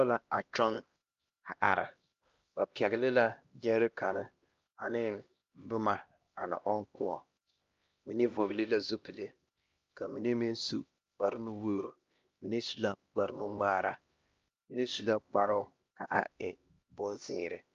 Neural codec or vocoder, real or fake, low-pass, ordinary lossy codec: codec, 16 kHz, 1 kbps, X-Codec, HuBERT features, trained on LibriSpeech; fake; 7.2 kHz; Opus, 32 kbps